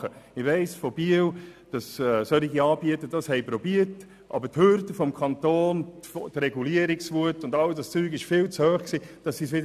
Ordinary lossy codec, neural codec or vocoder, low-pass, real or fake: none; none; 14.4 kHz; real